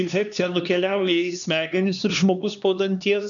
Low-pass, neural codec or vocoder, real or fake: 7.2 kHz; codec, 16 kHz, 2 kbps, X-Codec, HuBERT features, trained on LibriSpeech; fake